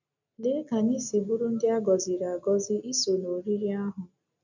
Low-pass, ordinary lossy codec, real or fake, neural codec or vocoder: 7.2 kHz; none; real; none